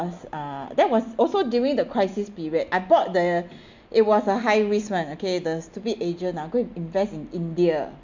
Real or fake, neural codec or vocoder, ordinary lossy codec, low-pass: real; none; MP3, 64 kbps; 7.2 kHz